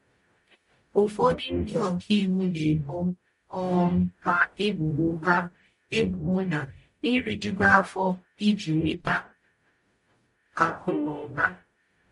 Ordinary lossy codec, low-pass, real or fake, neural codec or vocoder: MP3, 48 kbps; 14.4 kHz; fake; codec, 44.1 kHz, 0.9 kbps, DAC